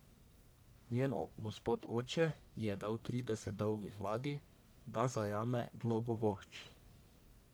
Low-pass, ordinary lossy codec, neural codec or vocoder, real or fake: none; none; codec, 44.1 kHz, 1.7 kbps, Pupu-Codec; fake